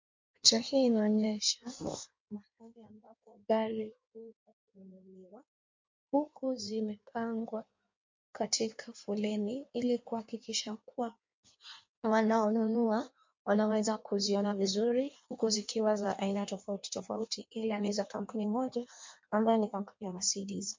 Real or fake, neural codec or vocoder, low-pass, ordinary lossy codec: fake; codec, 16 kHz in and 24 kHz out, 1.1 kbps, FireRedTTS-2 codec; 7.2 kHz; MP3, 48 kbps